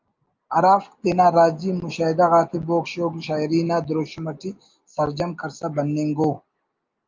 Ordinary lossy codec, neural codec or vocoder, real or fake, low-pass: Opus, 24 kbps; none; real; 7.2 kHz